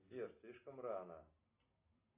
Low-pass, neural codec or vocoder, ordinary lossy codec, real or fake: 3.6 kHz; none; AAC, 32 kbps; real